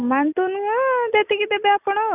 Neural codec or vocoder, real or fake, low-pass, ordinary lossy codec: none; real; 3.6 kHz; none